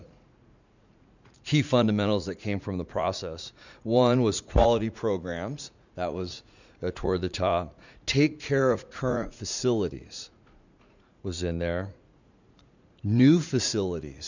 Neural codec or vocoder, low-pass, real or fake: vocoder, 44.1 kHz, 80 mel bands, Vocos; 7.2 kHz; fake